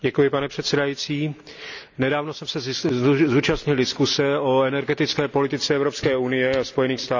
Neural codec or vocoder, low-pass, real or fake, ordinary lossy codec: none; 7.2 kHz; real; none